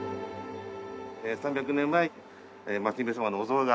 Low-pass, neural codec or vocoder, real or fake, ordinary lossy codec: none; none; real; none